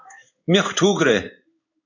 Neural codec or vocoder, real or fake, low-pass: codec, 16 kHz in and 24 kHz out, 1 kbps, XY-Tokenizer; fake; 7.2 kHz